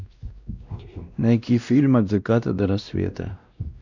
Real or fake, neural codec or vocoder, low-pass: fake; codec, 16 kHz, 1 kbps, X-Codec, WavLM features, trained on Multilingual LibriSpeech; 7.2 kHz